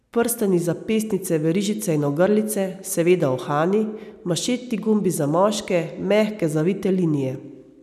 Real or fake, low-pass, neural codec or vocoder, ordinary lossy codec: real; 14.4 kHz; none; none